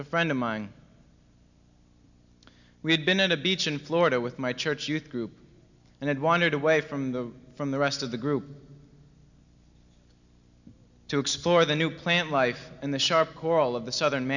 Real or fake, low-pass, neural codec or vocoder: real; 7.2 kHz; none